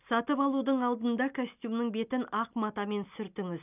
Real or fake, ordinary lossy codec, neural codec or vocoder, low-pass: real; none; none; 3.6 kHz